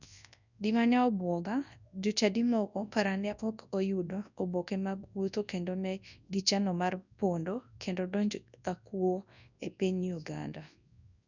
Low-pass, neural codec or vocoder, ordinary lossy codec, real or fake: 7.2 kHz; codec, 24 kHz, 0.9 kbps, WavTokenizer, large speech release; none; fake